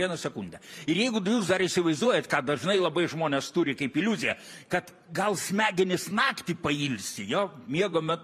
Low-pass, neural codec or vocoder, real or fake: 14.4 kHz; vocoder, 44.1 kHz, 128 mel bands every 512 samples, BigVGAN v2; fake